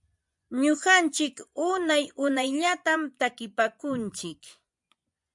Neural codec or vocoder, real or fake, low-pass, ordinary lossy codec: vocoder, 24 kHz, 100 mel bands, Vocos; fake; 10.8 kHz; MP3, 96 kbps